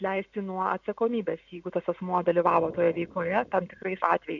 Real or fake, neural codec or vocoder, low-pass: real; none; 7.2 kHz